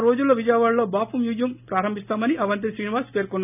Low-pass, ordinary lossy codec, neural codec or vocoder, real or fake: 3.6 kHz; none; none; real